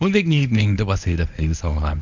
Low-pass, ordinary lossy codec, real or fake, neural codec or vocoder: 7.2 kHz; none; fake; codec, 24 kHz, 0.9 kbps, WavTokenizer, medium speech release version 1